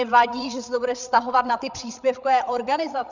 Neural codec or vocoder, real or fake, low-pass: codec, 16 kHz, 8 kbps, FreqCodec, larger model; fake; 7.2 kHz